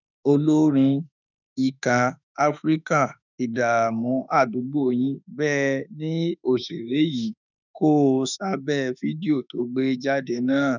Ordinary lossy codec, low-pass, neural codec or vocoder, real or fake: none; 7.2 kHz; autoencoder, 48 kHz, 32 numbers a frame, DAC-VAE, trained on Japanese speech; fake